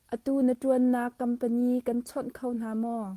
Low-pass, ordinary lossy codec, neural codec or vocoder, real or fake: 14.4 kHz; Opus, 24 kbps; none; real